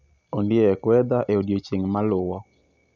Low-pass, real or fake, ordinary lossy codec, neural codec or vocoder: 7.2 kHz; real; none; none